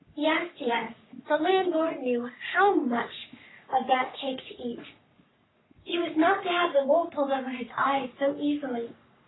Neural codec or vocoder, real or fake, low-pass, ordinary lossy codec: codec, 44.1 kHz, 3.4 kbps, Pupu-Codec; fake; 7.2 kHz; AAC, 16 kbps